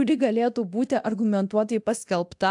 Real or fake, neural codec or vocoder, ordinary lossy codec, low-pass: fake; codec, 24 kHz, 0.9 kbps, DualCodec; AAC, 64 kbps; 10.8 kHz